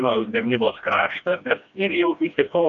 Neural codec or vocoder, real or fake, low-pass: codec, 16 kHz, 1 kbps, FreqCodec, smaller model; fake; 7.2 kHz